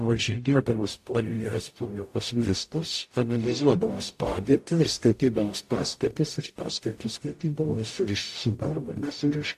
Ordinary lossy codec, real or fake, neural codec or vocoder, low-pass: AAC, 64 kbps; fake; codec, 44.1 kHz, 0.9 kbps, DAC; 14.4 kHz